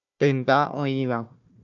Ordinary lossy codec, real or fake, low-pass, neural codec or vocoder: MP3, 96 kbps; fake; 7.2 kHz; codec, 16 kHz, 1 kbps, FunCodec, trained on Chinese and English, 50 frames a second